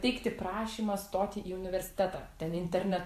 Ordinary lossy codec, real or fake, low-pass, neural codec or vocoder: AAC, 64 kbps; fake; 14.4 kHz; vocoder, 44.1 kHz, 128 mel bands every 512 samples, BigVGAN v2